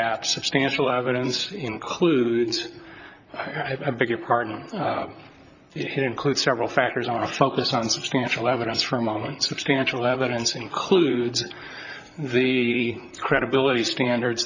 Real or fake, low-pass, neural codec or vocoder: fake; 7.2 kHz; vocoder, 44.1 kHz, 128 mel bands, Pupu-Vocoder